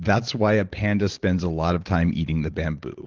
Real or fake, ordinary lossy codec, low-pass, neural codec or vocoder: real; Opus, 16 kbps; 7.2 kHz; none